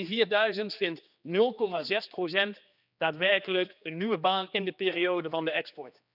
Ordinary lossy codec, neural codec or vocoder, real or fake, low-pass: none; codec, 16 kHz, 2 kbps, X-Codec, HuBERT features, trained on general audio; fake; 5.4 kHz